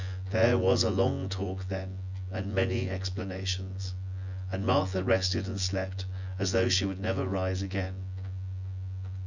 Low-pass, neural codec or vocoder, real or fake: 7.2 kHz; vocoder, 24 kHz, 100 mel bands, Vocos; fake